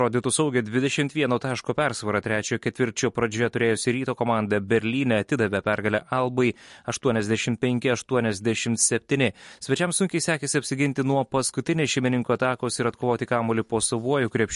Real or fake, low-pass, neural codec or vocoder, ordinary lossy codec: real; 14.4 kHz; none; MP3, 48 kbps